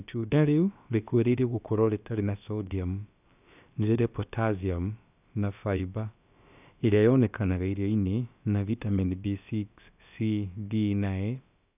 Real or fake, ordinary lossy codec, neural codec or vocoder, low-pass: fake; none; codec, 16 kHz, about 1 kbps, DyCAST, with the encoder's durations; 3.6 kHz